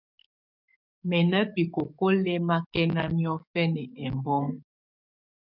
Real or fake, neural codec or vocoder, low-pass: fake; codec, 44.1 kHz, 7.8 kbps, DAC; 5.4 kHz